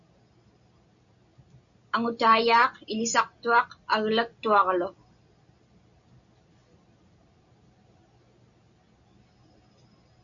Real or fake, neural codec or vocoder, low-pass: real; none; 7.2 kHz